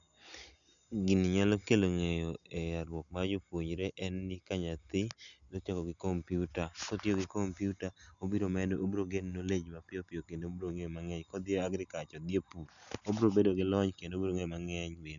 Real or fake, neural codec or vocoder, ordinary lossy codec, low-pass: real; none; none; 7.2 kHz